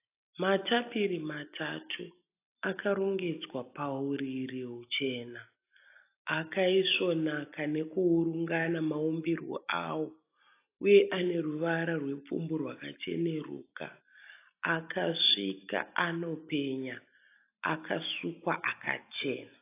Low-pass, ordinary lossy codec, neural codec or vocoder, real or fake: 3.6 kHz; AAC, 24 kbps; none; real